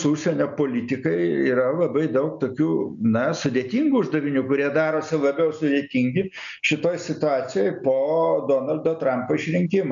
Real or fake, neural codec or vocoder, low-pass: real; none; 7.2 kHz